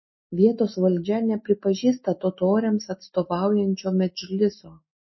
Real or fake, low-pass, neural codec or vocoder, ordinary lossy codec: real; 7.2 kHz; none; MP3, 24 kbps